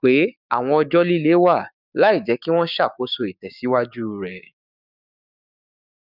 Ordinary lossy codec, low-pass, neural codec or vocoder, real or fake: none; 5.4 kHz; codec, 16 kHz, 6 kbps, DAC; fake